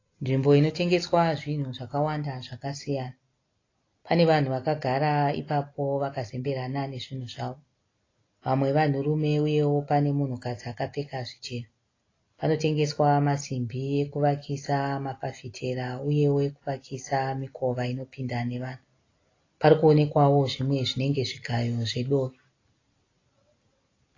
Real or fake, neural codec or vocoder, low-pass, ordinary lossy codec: real; none; 7.2 kHz; AAC, 32 kbps